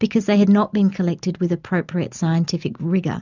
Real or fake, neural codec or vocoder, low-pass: real; none; 7.2 kHz